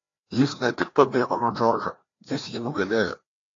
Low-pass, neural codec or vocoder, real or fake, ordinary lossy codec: 7.2 kHz; codec, 16 kHz, 1 kbps, FreqCodec, larger model; fake; AAC, 32 kbps